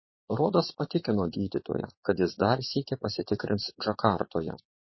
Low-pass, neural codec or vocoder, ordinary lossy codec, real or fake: 7.2 kHz; vocoder, 22.05 kHz, 80 mel bands, Vocos; MP3, 24 kbps; fake